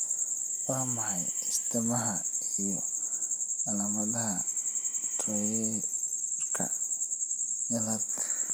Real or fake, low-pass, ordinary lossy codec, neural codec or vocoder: real; none; none; none